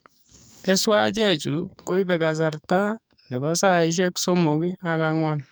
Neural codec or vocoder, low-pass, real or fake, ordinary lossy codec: codec, 44.1 kHz, 2.6 kbps, SNAC; none; fake; none